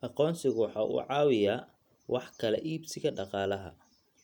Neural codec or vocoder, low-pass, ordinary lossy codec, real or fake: none; 19.8 kHz; none; real